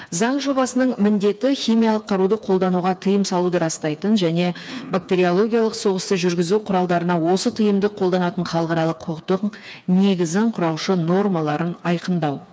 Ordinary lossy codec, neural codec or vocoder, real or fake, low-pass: none; codec, 16 kHz, 4 kbps, FreqCodec, smaller model; fake; none